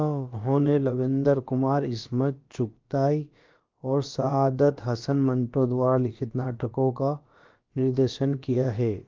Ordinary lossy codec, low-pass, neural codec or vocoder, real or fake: Opus, 32 kbps; 7.2 kHz; codec, 16 kHz, about 1 kbps, DyCAST, with the encoder's durations; fake